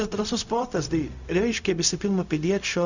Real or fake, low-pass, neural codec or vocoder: fake; 7.2 kHz; codec, 16 kHz, 0.4 kbps, LongCat-Audio-Codec